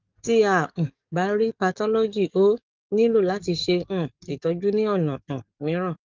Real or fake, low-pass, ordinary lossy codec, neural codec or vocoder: fake; 7.2 kHz; Opus, 24 kbps; codec, 44.1 kHz, 7.8 kbps, DAC